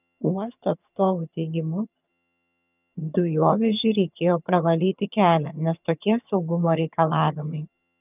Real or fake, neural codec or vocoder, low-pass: fake; vocoder, 22.05 kHz, 80 mel bands, HiFi-GAN; 3.6 kHz